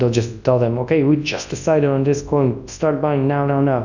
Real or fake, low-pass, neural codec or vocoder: fake; 7.2 kHz; codec, 24 kHz, 0.9 kbps, WavTokenizer, large speech release